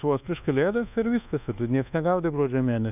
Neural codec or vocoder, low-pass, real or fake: codec, 24 kHz, 1.2 kbps, DualCodec; 3.6 kHz; fake